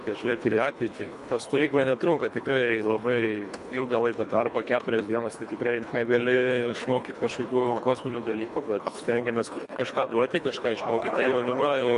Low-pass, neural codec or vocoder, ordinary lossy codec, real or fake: 10.8 kHz; codec, 24 kHz, 1.5 kbps, HILCodec; MP3, 64 kbps; fake